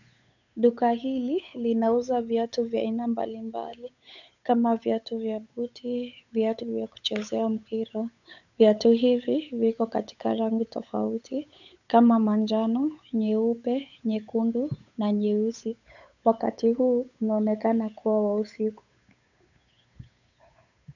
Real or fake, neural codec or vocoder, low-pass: fake; codec, 16 kHz, 8 kbps, FunCodec, trained on Chinese and English, 25 frames a second; 7.2 kHz